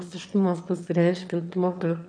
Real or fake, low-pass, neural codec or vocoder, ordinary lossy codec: fake; 9.9 kHz; autoencoder, 22.05 kHz, a latent of 192 numbers a frame, VITS, trained on one speaker; MP3, 96 kbps